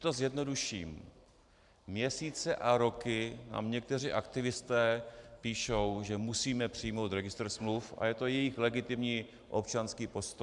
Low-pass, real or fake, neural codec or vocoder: 10.8 kHz; fake; vocoder, 44.1 kHz, 128 mel bands every 512 samples, BigVGAN v2